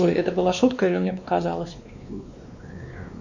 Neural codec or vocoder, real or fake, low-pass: codec, 16 kHz, 2 kbps, X-Codec, WavLM features, trained on Multilingual LibriSpeech; fake; 7.2 kHz